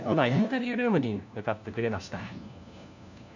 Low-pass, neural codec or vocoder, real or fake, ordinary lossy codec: 7.2 kHz; codec, 16 kHz, 1 kbps, FunCodec, trained on LibriTTS, 50 frames a second; fake; none